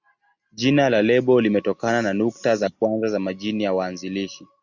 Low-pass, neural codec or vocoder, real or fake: 7.2 kHz; none; real